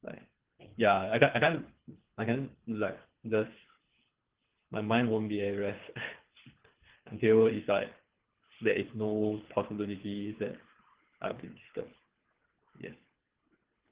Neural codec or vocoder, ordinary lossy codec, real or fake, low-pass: codec, 24 kHz, 3 kbps, HILCodec; Opus, 16 kbps; fake; 3.6 kHz